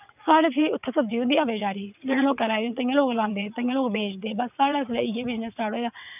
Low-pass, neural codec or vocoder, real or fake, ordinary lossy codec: 3.6 kHz; vocoder, 22.05 kHz, 80 mel bands, WaveNeXt; fake; none